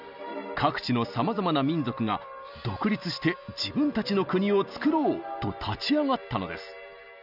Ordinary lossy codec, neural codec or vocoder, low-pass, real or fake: none; none; 5.4 kHz; real